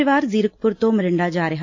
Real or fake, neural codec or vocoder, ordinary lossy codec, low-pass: real; none; AAC, 48 kbps; 7.2 kHz